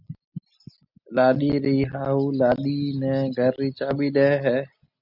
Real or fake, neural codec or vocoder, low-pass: real; none; 5.4 kHz